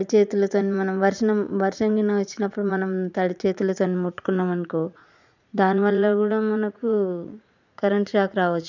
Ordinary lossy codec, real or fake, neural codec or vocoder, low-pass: none; fake; vocoder, 44.1 kHz, 80 mel bands, Vocos; 7.2 kHz